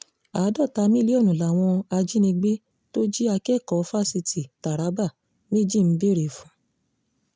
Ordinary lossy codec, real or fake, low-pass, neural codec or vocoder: none; real; none; none